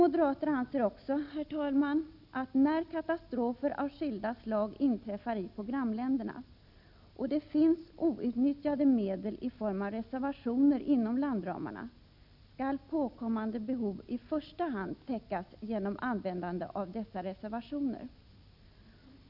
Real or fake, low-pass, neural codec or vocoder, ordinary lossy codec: real; 5.4 kHz; none; none